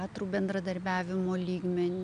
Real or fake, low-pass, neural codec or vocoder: real; 9.9 kHz; none